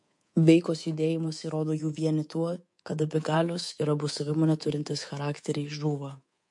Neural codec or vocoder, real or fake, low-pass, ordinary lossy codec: codec, 24 kHz, 3.1 kbps, DualCodec; fake; 10.8 kHz; MP3, 48 kbps